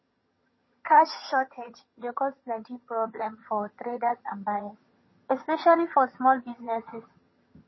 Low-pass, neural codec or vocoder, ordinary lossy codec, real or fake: 7.2 kHz; vocoder, 22.05 kHz, 80 mel bands, HiFi-GAN; MP3, 24 kbps; fake